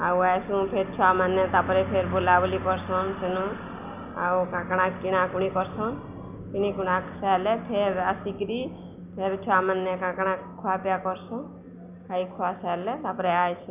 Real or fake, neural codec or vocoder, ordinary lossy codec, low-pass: real; none; none; 3.6 kHz